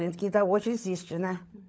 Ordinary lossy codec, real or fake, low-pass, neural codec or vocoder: none; fake; none; codec, 16 kHz, 16 kbps, FunCodec, trained on LibriTTS, 50 frames a second